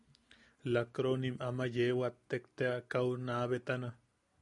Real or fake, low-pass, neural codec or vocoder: real; 10.8 kHz; none